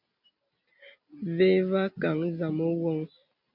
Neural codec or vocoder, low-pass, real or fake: none; 5.4 kHz; real